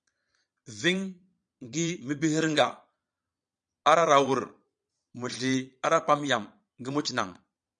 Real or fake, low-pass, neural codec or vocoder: fake; 9.9 kHz; vocoder, 22.05 kHz, 80 mel bands, Vocos